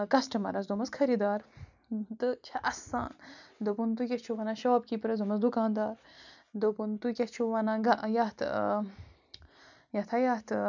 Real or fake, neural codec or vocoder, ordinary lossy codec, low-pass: real; none; none; 7.2 kHz